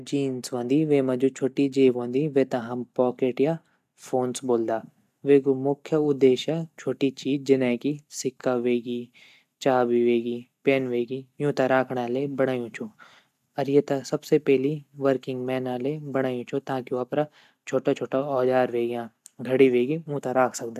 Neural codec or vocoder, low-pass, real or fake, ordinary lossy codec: none; 14.4 kHz; real; AAC, 96 kbps